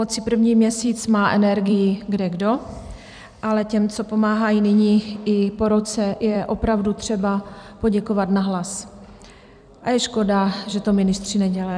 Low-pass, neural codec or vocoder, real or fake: 9.9 kHz; vocoder, 44.1 kHz, 128 mel bands every 256 samples, BigVGAN v2; fake